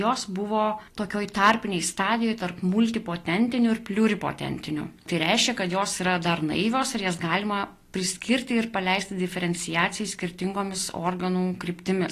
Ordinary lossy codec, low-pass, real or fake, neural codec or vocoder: AAC, 48 kbps; 14.4 kHz; real; none